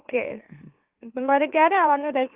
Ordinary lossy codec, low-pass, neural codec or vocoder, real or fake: Opus, 32 kbps; 3.6 kHz; autoencoder, 44.1 kHz, a latent of 192 numbers a frame, MeloTTS; fake